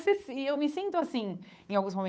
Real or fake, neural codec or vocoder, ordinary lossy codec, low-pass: fake; codec, 16 kHz, 8 kbps, FunCodec, trained on Chinese and English, 25 frames a second; none; none